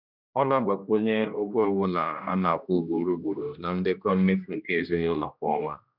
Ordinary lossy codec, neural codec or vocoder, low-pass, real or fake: none; codec, 16 kHz, 1 kbps, X-Codec, HuBERT features, trained on general audio; 5.4 kHz; fake